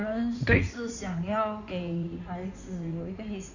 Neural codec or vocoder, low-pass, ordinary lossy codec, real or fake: codec, 16 kHz in and 24 kHz out, 2.2 kbps, FireRedTTS-2 codec; 7.2 kHz; none; fake